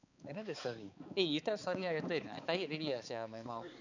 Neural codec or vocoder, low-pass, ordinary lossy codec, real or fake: codec, 16 kHz, 4 kbps, X-Codec, HuBERT features, trained on balanced general audio; 7.2 kHz; none; fake